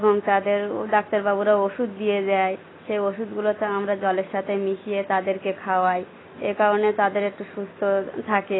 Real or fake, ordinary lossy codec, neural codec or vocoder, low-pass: real; AAC, 16 kbps; none; 7.2 kHz